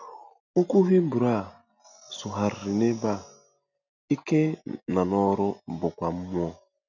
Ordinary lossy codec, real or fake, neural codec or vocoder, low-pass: none; real; none; 7.2 kHz